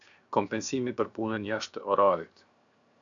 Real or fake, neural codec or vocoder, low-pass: fake; codec, 16 kHz, 0.7 kbps, FocalCodec; 7.2 kHz